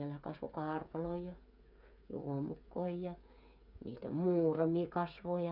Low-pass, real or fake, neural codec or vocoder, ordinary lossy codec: 5.4 kHz; fake; codec, 16 kHz, 8 kbps, FreqCodec, smaller model; none